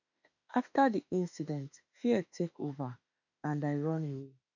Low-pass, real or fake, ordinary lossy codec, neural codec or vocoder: 7.2 kHz; fake; none; autoencoder, 48 kHz, 32 numbers a frame, DAC-VAE, trained on Japanese speech